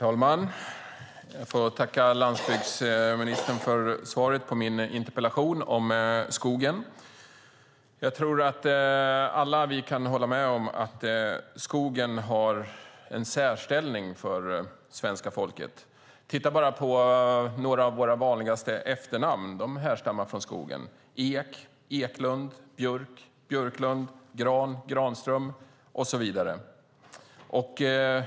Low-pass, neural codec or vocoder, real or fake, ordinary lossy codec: none; none; real; none